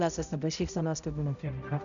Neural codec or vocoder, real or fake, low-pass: codec, 16 kHz, 0.5 kbps, X-Codec, HuBERT features, trained on balanced general audio; fake; 7.2 kHz